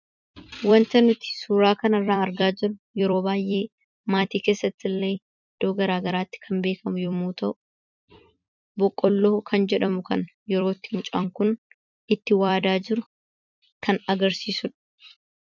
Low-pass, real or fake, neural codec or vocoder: 7.2 kHz; fake; vocoder, 44.1 kHz, 128 mel bands every 256 samples, BigVGAN v2